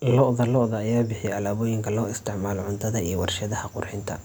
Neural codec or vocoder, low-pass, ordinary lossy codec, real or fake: none; none; none; real